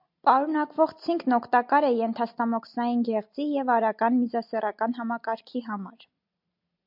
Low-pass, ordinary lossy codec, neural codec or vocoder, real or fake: 5.4 kHz; MP3, 48 kbps; none; real